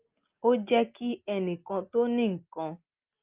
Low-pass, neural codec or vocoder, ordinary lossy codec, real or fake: 3.6 kHz; none; Opus, 24 kbps; real